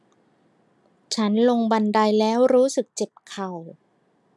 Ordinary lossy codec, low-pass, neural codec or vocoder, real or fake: none; none; none; real